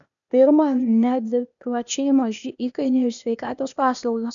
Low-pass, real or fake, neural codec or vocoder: 7.2 kHz; fake; codec, 16 kHz, 0.8 kbps, ZipCodec